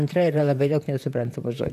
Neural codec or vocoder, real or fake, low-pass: vocoder, 44.1 kHz, 128 mel bands, Pupu-Vocoder; fake; 14.4 kHz